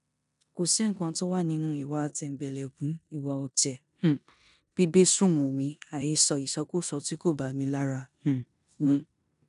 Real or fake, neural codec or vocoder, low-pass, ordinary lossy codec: fake; codec, 16 kHz in and 24 kHz out, 0.9 kbps, LongCat-Audio-Codec, four codebook decoder; 10.8 kHz; none